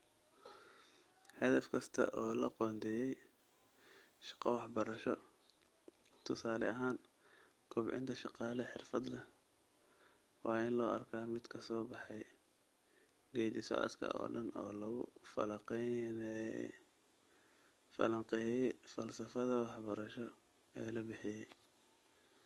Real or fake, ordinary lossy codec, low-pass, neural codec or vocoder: fake; Opus, 32 kbps; 19.8 kHz; codec, 44.1 kHz, 7.8 kbps, DAC